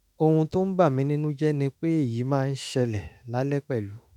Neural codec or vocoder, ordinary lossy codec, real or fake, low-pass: autoencoder, 48 kHz, 32 numbers a frame, DAC-VAE, trained on Japanese speech; none; fake; 19.8 kHz